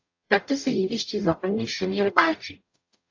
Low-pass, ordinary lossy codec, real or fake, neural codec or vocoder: 7.2 kHz; AAC, 48 kbps; fake; codec, 44.1 kHz, 0.9 kbps, DAC